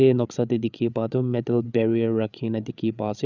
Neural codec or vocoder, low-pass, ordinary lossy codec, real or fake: codec, 16 kHz, 8 kbps, FreqCodec, larger model; 7.2 kHz; Opus, 64 kbps; fake